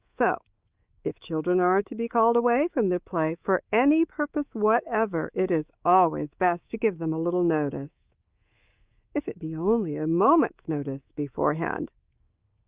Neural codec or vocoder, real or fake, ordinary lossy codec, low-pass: codec, 24 kHz, 3.1 kbps, DualCodec; fake; Opus, 24 kbps; 3.6 kHz